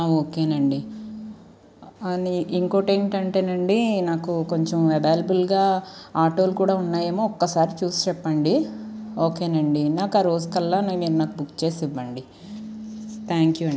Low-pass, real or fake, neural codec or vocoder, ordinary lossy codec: none; real; none; none